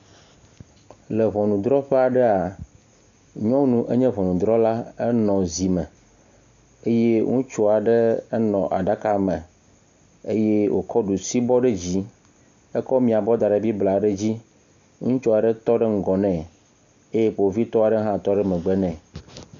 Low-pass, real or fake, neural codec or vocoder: 7.2 kHz; real; none